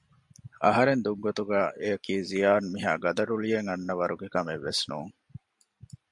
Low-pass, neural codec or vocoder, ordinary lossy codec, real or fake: 10.8 kHz; none; MP3, 64 kbps; real